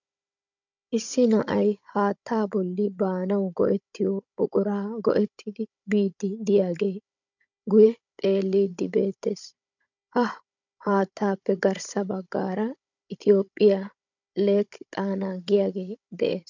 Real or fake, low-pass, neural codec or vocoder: fake; 7.2 kHz; codec, 16 kHz, 16 kbps, FunCodec, trained on Chinese and English, 50 frames a second